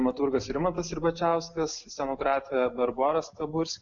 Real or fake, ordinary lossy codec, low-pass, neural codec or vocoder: real; AAC, 64 kbps; 7.2 kHz; none